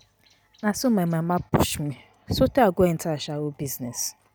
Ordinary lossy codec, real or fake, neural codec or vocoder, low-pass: none; real; none; none